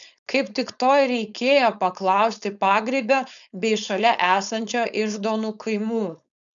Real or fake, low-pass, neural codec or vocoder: fake; 7.2 kHz; codec, 16 kHz, 4.8 kbps, FACodec